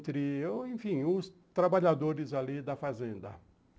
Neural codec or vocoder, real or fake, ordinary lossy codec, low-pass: none; real; none; none